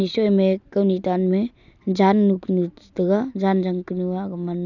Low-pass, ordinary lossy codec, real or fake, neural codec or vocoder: 7.2 kHz; none; real; none